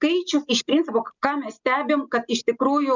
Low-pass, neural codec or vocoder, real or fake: 7.2 kHz; none; real